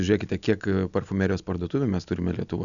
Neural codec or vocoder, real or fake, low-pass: none; real; 7.2 kHz